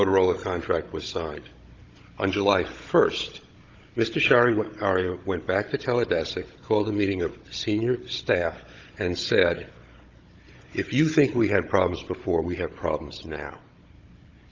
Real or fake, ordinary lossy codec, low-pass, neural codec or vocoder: fake; Opus, 32 kbps; 7.2 kHz; codec, 16 kHz, 16 kbps, FunCodec, trained on Chinese and English, 50 frames a second